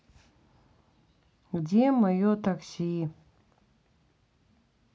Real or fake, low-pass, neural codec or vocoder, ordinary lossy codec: real; none; none; none